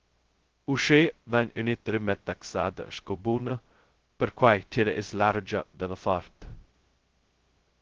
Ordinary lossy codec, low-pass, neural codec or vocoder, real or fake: Opus, 16 kbps; 7.2 kHz; codec, 16 kHz, 0.2 kbps, FocalCodec; fake